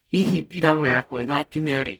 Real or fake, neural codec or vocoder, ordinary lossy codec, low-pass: fake; codec, 44.1 kHz, 0.9 kbps, DAC; none; none